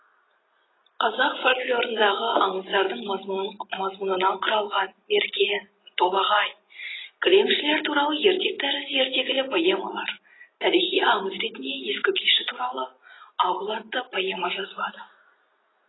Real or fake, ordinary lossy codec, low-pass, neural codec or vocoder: real; AAC, 16 kbps; 7.2 kHz; none